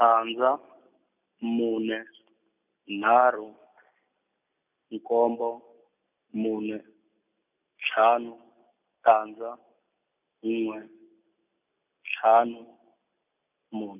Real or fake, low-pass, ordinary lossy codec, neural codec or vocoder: real; 3.6 kHz; none; none